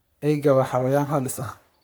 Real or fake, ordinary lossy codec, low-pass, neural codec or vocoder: fake; none; none; codec, 44.1 kHz, 3.4 kbps, Pupu-Codec